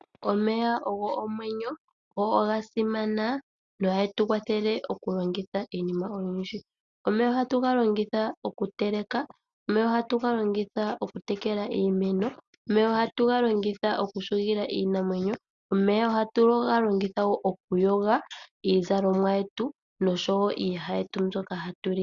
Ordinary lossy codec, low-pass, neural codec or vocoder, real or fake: Opus, 64 kbps; 7.2 kHz; none; real